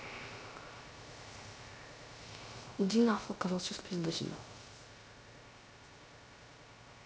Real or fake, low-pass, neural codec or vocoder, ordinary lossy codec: fake; none; codec, 16 kHz, 0.3 kbps, FocalCodec; none